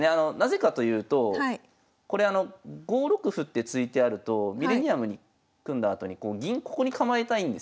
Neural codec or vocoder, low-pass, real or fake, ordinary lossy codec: none; none; real; none